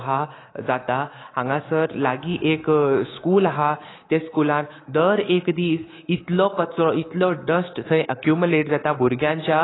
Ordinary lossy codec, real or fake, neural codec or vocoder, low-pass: AAC, 16 kbps; fake; codec, 24 kHz, 3.1 kbps, DualCodec; 7.2 kHz